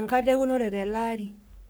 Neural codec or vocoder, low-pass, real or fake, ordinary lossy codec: codec, 44.1 kHz, 3.4 kbps, Pupu-Codec; none; fake; none